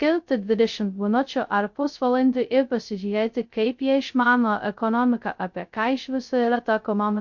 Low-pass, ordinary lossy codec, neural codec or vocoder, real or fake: 7.2 kHz; MP3, 48 kbps; codec, 16 kHz, 0.2 kbps, FocalCodec; fake